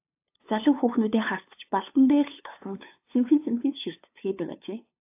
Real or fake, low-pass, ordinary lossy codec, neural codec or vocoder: fake; 3.6 kHz; AAC, 32 kbps; codec, 16 kHz, 2 kbps, FunCodec, trained on LibriTTS, 25 frames a second